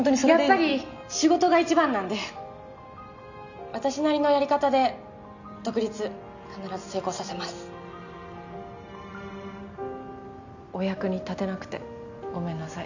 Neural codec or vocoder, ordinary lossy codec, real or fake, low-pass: none; none; real; 7.2 kHz